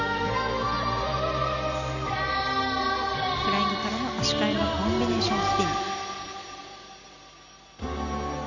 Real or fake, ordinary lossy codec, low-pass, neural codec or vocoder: real; none; 7.2 kHz; none